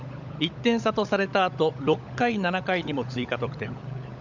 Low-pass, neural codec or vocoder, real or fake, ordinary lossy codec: 7.2 kHz; codec, 16 kHz, 16 kbps, FunCodec, trained on LibriTTS, 50 frames a second; fake; none